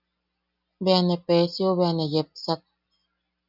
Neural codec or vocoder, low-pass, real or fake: none; 5.4 kHz; real